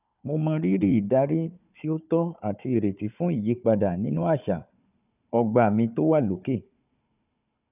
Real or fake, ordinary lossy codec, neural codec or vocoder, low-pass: fake; none; codec, 16 kHz, 4 kbps, FunCodec, trained on Chinese and English, 50 frames a second; 3.6 kHz